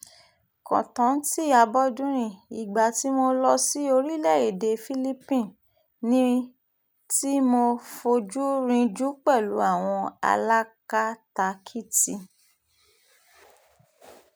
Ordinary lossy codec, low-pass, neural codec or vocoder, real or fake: none; none; none; real